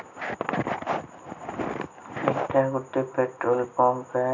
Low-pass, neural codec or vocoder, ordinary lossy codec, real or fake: 7.2 kHz; none; none; real